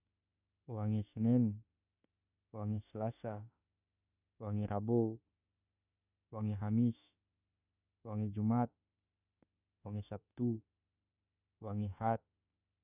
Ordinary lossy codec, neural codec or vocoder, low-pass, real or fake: none; autoencoder, 48 kHz, 32 numbers a frame, DAC-VAE, trained on Japanese speech; 3.6 kHz; fake